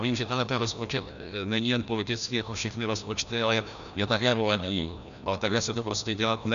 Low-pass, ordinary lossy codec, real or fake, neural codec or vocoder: 7.2 kHz; MP3, 96 kbps; fake; codec, 16 kHz, 1 kbps, FreqCodec, larger model